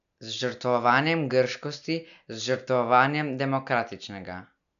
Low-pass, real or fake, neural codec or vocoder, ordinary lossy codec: 7.2 kHz; real; none; none